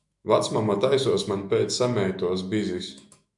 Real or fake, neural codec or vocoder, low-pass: fake; autoencoder, 48 kHz, 128 numbers a frame, DAC-VAE, trained on Japanese speech; 10.8 kHz